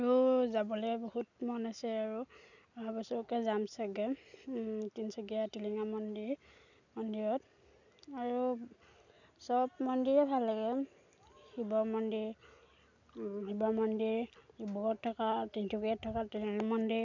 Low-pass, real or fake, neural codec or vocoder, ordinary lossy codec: 7.2 kHz; real; none; none